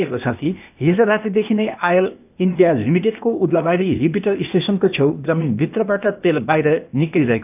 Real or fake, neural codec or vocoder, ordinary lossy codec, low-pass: fake; codec, 16 kHz, 0.8 kbps, ZipCodec; none; 3.6 kHz